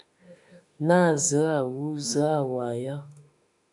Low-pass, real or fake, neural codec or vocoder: 10.8 kHz; fake; autoencoder, 48 kHz, 32 numbers a frame, DAC-VAE, trained on Japanese speech